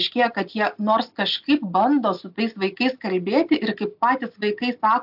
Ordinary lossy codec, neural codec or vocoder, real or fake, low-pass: AAC, 48 kbps; none; real; 5.4 kHz